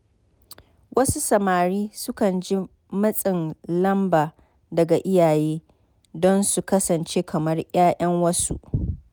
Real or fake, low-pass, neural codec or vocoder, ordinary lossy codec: real; none; none; none